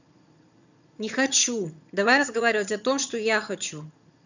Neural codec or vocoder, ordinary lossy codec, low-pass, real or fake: vocoder, 22.05 kHz, 80 mel bands, HiFi-GAN; none; 7.2 kHz; fake